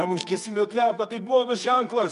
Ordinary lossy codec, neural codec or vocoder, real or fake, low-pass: MP3, 96 kbps; codec, 24 kHz, 0.9 kbps, WavTokenizer, medium music audio release; fake; 10.8 kHz